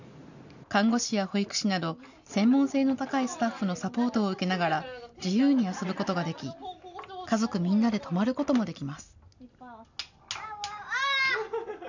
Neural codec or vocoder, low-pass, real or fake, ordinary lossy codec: none; 7.2 kHz; real; none